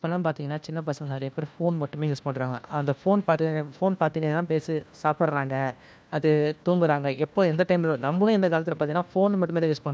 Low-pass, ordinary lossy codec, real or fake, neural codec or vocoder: none; none; fake; codec, 16 kHz, 1 kbps, FunCodec, trained on LibriTTS, 50 frames a second